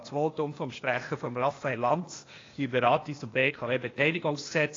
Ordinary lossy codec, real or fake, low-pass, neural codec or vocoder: AAC, 32 kbps; fake; 7.2 kHz; codec, 16 kHz, 0.8 kbps, ZipCodec